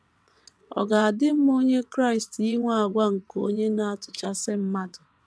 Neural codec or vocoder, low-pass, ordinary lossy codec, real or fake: vocoder, 22.05 kHz, 80 mel bands, WaveNeXt; none; none; fake